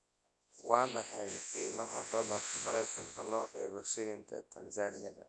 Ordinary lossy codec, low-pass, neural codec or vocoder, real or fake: AAC, 64 kbps; 10.8 kHz; codec, 24 kHz, 0.9 kbps, WavTokenizer, large speech release; fake